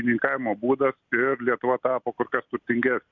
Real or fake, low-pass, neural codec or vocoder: real; 7.2 kHz; none